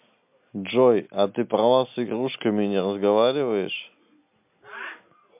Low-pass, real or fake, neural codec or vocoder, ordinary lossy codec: 3.6 kHz; real; none; MP3, 32 kbps